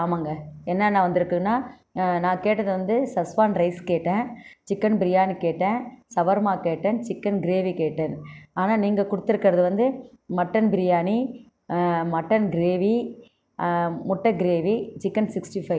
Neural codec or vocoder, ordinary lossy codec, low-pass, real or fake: none; none; none; real